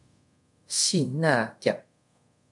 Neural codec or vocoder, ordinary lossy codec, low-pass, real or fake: codec, 24 kHz, 0.5 kbps, DualCodec; MP3, 64 kbps; 10.8 kHz; fake